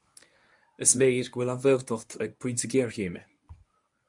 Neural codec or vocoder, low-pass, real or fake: codec, 24 kHz, 0.9 kbps, WavTokenizer, medium speech release version 1; 10.8 kHz; fake